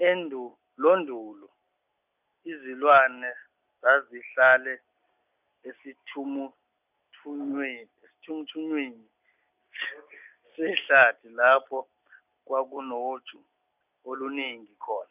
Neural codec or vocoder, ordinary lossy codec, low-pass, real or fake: none; none; 3.6 kHz; real